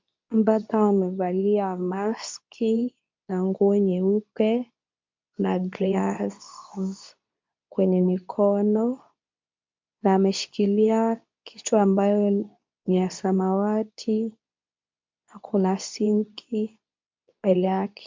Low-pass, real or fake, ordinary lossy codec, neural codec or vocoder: 7.2 kHz; fake; MP3, 64 kbps; codec, 24 kHz, 0.9 kbps, WavTokenizer, medium speech release version 2